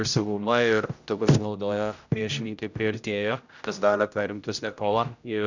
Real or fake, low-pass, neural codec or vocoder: fake; 7.2 kHz; codec, 16 kHz, 0.5 kbps, X-Codec, HuBERT features, trained on general audio